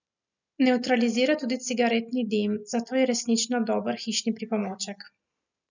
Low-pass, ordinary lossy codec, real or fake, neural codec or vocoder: 7.2 kHz; none; real; none